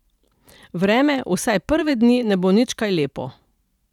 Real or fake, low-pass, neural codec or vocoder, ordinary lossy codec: fake; 19.8 kHz; vocoder, 44.1 kHz, 128 mel bands every 512 samples, BigVGAN v2; none